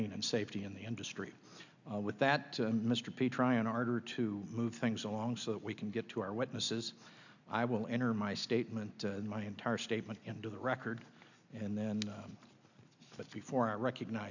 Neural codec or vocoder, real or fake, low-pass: none; real; 7.2 kHz